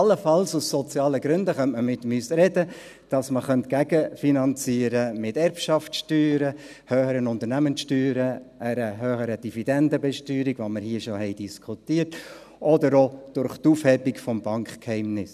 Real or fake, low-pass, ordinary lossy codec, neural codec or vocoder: real; 14.4 kHz; none; none